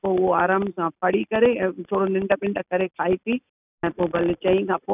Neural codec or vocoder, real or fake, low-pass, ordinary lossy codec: none; real; 3.6 kHz; none